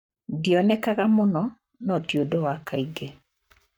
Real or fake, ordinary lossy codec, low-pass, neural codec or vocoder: fake; none; 19.8 kHz; codec, 44.1 kHz, 7.8 kbps, Pupu-Codec